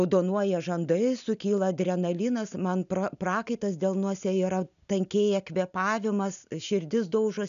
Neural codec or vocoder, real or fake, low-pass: none; real; 7.2 kHz